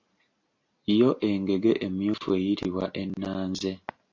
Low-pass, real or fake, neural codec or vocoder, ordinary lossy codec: 7.2 kHz; real; none; AAC, 48 kbps